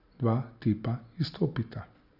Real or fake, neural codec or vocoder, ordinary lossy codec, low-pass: real; none; Opus, 64 kbps; 5.4 kHz